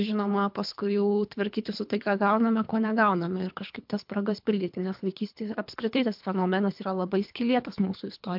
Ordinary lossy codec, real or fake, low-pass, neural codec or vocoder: MP3, 48 kbps; fake; 5.4 kHz; codec, 24 kHz, 3 kbps, HILCodec